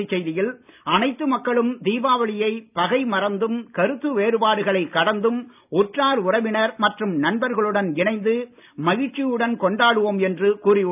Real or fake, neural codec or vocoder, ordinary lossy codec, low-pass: real; none; none; 3.6 kHz